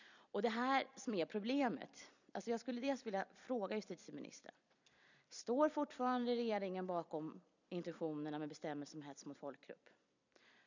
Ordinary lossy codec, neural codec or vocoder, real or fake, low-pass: none; vocoder, 44.1 kHz, 128 mel bands every 256 samples, BigVGAN v2; fake; 7.2 kHz